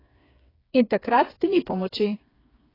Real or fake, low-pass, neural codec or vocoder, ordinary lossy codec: fake; 5.4 kHz; codec, 16 kHz, 4 kbps, FreqCodec, smaller model; AAC, 24 kbps